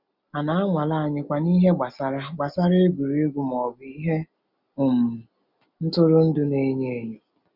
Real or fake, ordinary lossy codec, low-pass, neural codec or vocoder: real; none; 5.4 kHz; none